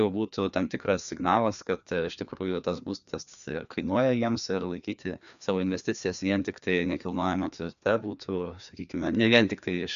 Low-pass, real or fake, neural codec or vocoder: 7.2 kHz; fake; codec, 16 kHz, 2 kbps, FreqCodec, larger model